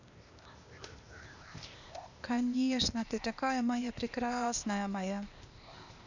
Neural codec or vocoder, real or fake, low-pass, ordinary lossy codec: codec, 16 kHz, 0.8 kbps, ZipCodec; fake; 7.2 kHz; none